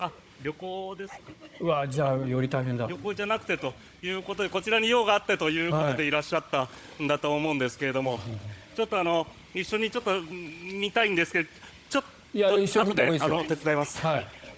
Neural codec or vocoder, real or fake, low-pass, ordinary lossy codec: codec, 16 kHz, 16 kbps, FunCodec, trained on Chinese and English, 50 frames a second; fake; none; none